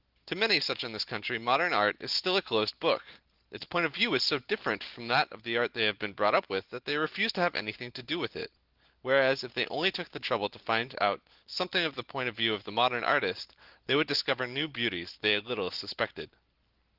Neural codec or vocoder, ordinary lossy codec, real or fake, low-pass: none; Opus, 16 kbps; real; 5.4 kHz